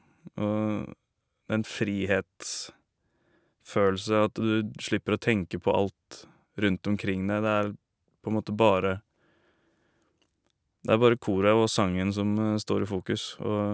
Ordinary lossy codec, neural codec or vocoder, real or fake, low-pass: none; none; real; none